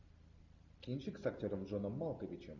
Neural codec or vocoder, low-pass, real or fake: none; 7.2 kHz; real